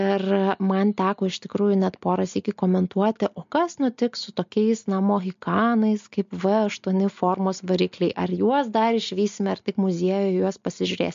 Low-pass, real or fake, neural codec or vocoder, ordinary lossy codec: 7.2 kHz; real; none; MP3, 48 kbps